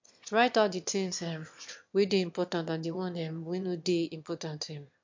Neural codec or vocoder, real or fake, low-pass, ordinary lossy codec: autoencoder, 22.05 kHz, a latent of 192 numbers a frame, VITS, trained on one speaker; fake; 7.2 kHz; MP3, 48 kbps